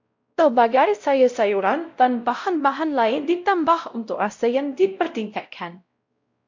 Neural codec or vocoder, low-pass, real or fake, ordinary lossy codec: codec, 16 kHz, 0.5 kbps, X-Codec, WavLM features, trained on Multilingual LibriSpeech; 7.2 kHz; fake; MP3, 64 kbps